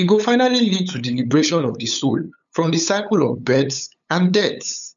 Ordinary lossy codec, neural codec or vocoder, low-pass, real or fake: none; codec, 16 kHz, 8 kbps, FunCodec, trained on LibriTTS, 25 frames a second; 7.2 kHz; fake